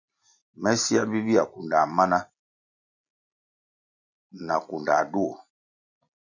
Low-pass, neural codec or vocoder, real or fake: 7.2 kHz; none; real